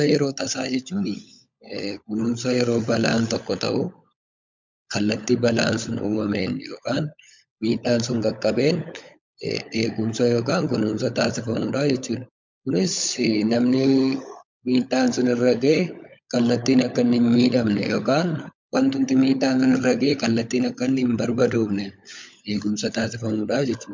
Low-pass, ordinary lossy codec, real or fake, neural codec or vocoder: 7.2 kHz; MP3, 64 kbps; fake; codec, 16 kHz, 16 kbps, FunCodec, trained on LibriTTS, 50 frames a second